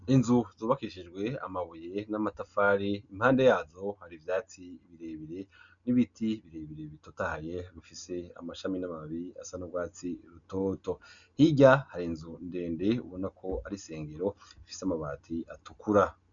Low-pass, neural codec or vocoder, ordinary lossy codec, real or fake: 7.2 kHz; none; MP3, 96 kbps; real